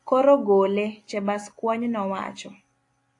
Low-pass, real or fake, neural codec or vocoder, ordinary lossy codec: 10.8 kHz; real; none; AAC, 48 kbps